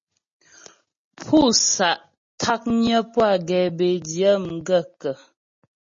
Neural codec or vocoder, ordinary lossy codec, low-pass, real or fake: none; MP3, 32 kbps; 7.2 kHz; real